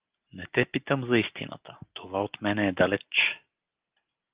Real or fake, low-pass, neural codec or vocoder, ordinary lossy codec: real; 3.6 kHz; none; Opus, 32 kbps